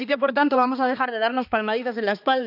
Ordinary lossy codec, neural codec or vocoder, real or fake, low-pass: none; codec, 16 kHz, 2 kbps, X-Codec, HuBERT features, trained on balanced general audio; fake; 5.4 kHz